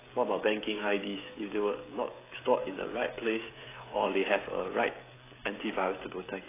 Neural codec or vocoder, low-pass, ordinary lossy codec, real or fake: codec, 16 kHz, 16 kbps, FreqCodec, smaller model; 3.6 kHz; AAC, 16 kbps; fake